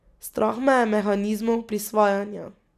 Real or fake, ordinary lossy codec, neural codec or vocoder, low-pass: real; none; none; 14.4 kHz